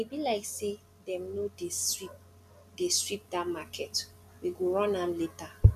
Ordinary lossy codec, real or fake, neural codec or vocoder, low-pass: none; real; none; 14.4 kHz